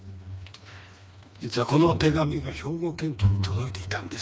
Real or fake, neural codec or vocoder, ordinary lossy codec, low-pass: fake; codec, 16 kHz, 2 kbps, FreqCodec, smaller model; none; none